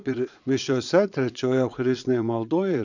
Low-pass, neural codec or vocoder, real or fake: 7.2 kHz; vocoder, 22.05 kHz, 80 mel bands, Vocos; fake